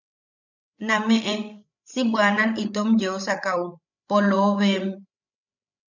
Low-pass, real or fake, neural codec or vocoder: 7.2 kHz; fake; codec, 16 kHz, 16 kbps, FreqCodec, larger model